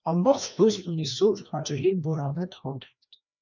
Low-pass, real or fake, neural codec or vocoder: 7.2 kHz; fake; codec, 16 kHz, 1 kbps, FreqCodec, larger model